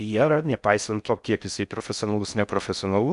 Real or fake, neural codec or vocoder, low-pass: fake; codec, 16 kHz in and 24 kHz out, 0.6 kbps, FocalCodec, streaming, 4096 codes; 10.8 kHz